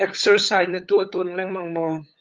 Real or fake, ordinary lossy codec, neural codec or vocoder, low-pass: fake; Opus, 32 kbps; codec, 16 kHz, 8 kbps, FunCodec, trained on LibriTTS, 25 frames a second; 7.2 kHz